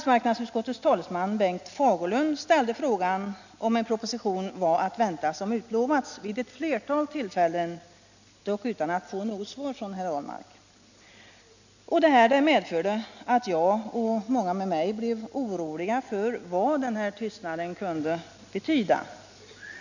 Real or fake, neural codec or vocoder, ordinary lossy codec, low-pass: real; none; none; 7.2 kHz